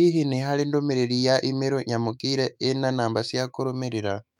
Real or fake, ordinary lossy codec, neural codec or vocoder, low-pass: fake; none; autoencoder, 48 kHz, 128 numbers a frame, DAC-VAE, trained on Japanese speech; 19.8 kHz